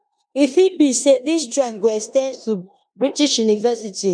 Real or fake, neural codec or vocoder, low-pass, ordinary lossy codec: fake; codec, 16 kHz in and 24 kHz out, 0.9 kbps, LongCat-Audio-Codec, four codebook decoder; 9.9 kHz; none